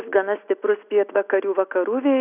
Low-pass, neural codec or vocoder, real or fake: 3.6 kHz; none; real